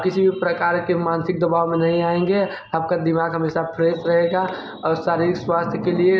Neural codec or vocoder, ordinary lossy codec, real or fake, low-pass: none; none; real; none